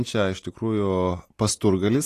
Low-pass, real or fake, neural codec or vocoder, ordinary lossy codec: 14.4 kHz; real; none; AAC, 48 kbps